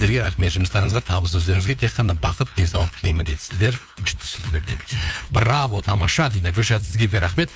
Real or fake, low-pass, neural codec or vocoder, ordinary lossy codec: fake; none; codec, 16 kHz, 2 kbps, FunCodec, trained on LibriTTS, 25 frames a second; none